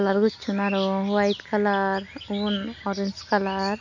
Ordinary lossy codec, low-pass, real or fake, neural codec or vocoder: none; 7.2 kHz; real; none